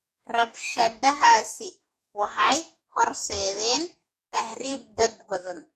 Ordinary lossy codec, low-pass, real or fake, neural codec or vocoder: none; 14.4 kHz; fake; codec, 44.1 kHz, 2.6 kbps, DAC